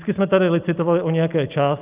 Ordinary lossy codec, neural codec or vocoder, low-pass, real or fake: Opus, 32 kbps; none; 3.6 kHz; real